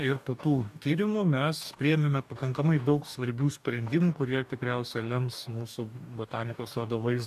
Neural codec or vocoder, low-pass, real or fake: codec, 44.1 kHz, 2.6 kbps, DAC; 14.4 kHz; fake